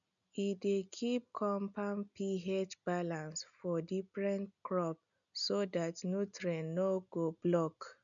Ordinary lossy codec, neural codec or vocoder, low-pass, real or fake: none; none; 7.2 kHz; real